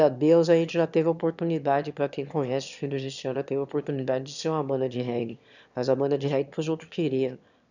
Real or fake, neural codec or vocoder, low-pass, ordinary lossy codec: fake; autoencoder, 22.05 kHz, a latent of 192 numbers a frame, VITS, trained on one speaker; 7.2 kHz; none